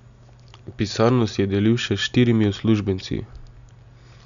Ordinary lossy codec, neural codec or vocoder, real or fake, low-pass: none; none; real; 7.2 kHz